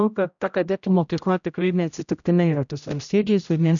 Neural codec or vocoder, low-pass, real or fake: codec, 16 kHz, 0.5 kbps, X-Codec, HuBERT features, trained on general audio; 7.2 kHz; fake